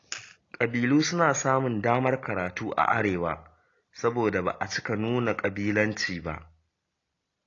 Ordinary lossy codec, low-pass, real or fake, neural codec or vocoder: AAC, 32 kbps; 7.2 kHz; real; none